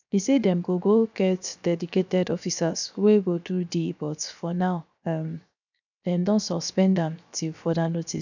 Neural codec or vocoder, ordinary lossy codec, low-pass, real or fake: codec, 16 kHz, 0.7 kbps, FocalCodec; none; 7.2 kHz; fake